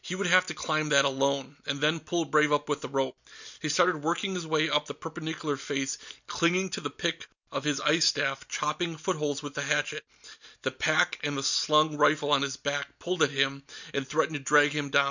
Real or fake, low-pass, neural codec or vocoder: real; 7.2 kHz; none